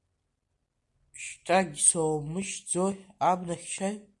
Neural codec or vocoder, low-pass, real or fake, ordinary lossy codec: none; 10.8 kHz; real; MP3, 48 kbps